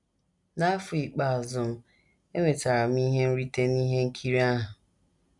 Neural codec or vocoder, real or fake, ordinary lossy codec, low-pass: none; real; none; 10.8 kHz